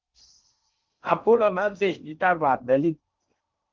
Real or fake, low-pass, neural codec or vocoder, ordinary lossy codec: fake; 7.2 kHz; codec, 16 kHz in and 24 kHz out, 0.6 kbps, FocalCodec, streaming, 4096 codes; Opus, 32 kbps